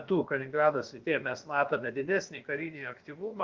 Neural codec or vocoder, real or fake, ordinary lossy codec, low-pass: codec, 16 kHz, about 1 kbps, DyCAST, with the encoder's durations; fake; Opus, 32 kbps; 7.2 kHz